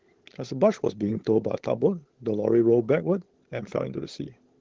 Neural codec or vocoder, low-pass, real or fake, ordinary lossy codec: codec, 16 kHz, 16 kbps, FunCodec, trained on LibriTTS, 50 frames a second; 7.2 kHz; fake; Opus, 16 kbps